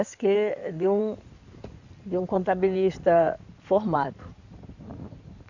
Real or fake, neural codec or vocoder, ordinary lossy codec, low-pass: fake; codec, 16 kHz in and 24 kHz out, 2.2 kbps, FireRedTTS-2 codec; none; 7.2 kHz